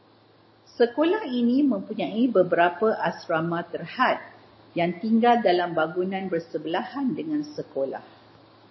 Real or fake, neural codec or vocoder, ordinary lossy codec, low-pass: real; none; MP3, 24 kbps; 7.2 kHz